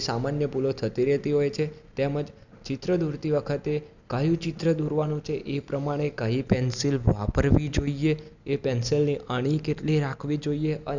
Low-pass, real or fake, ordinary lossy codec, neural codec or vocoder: 7.2 kHz; real; none; none